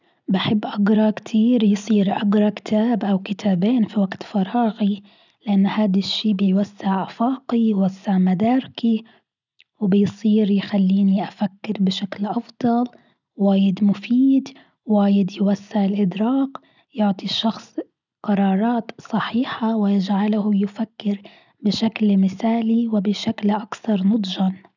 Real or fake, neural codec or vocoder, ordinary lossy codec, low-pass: real; none; none; 7.2 kHz